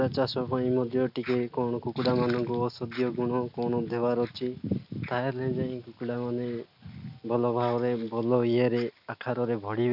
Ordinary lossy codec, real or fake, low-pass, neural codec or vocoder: none; real; 5.4 kHz; none